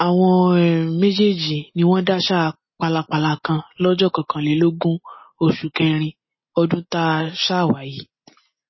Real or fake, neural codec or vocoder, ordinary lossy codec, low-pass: real; none; MP3, 24 kbps; 7.2 kHz